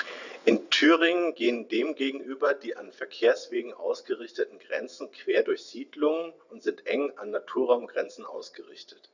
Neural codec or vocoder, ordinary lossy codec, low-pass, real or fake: vocoder, 22.05 kHz, 80 mel bands, WaveNeXt; none; 7.2 kHz; fake